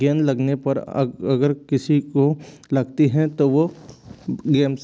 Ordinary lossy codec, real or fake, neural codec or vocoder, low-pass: none; real; none; none